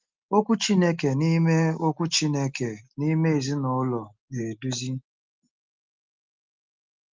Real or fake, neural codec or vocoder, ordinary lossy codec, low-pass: real; none; Opus, 32 kbps; 7.2 kHz